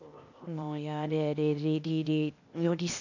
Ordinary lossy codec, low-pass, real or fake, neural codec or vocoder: none; 7.2 kHz; fake; codec, 16 kHz, 0.8 kbps, ZipCodec